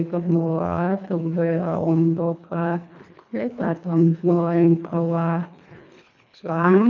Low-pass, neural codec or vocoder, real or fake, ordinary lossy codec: 7.2 kHz; codec, 24 kHz, 1.5 kbps, HILCodec; fake; none